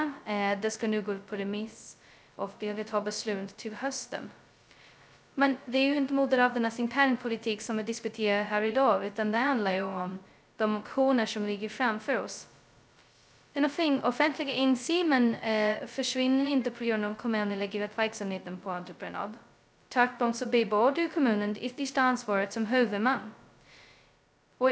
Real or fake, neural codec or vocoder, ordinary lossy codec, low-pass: fake; codec, 16 kHz, 0.2 kbps, FocalCodec; none; none